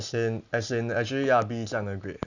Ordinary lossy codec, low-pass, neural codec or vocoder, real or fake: none; 7.2 kHz; none; real